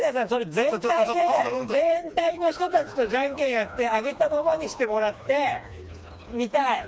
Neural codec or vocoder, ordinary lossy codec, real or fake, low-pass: codec, 16 kHz, 2 kbps, FreqCodec, smaller model; none; fake; none